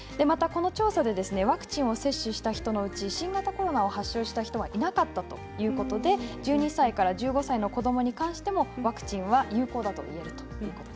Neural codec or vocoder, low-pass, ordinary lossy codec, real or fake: none; none; none; real